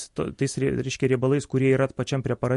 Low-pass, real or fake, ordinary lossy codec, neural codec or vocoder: 14.4 kHz; real; MP3, 48 kbps; none